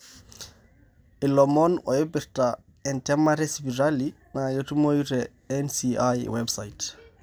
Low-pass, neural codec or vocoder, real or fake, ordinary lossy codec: none; none; real; none